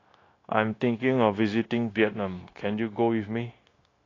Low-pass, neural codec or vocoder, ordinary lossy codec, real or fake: 7.2 kHz; codec, 16 kHz, 0.9 kbps, LongCat-Audio-Codec; AAC, 32 kbps; fake